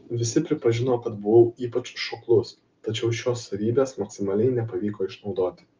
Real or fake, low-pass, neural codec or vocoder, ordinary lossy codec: real; 7.2 kHz; none; Opus, 24 kbps